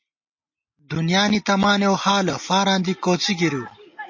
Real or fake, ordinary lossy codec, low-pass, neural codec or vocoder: real; MP3, 32 kbps; 7.2 kHz; none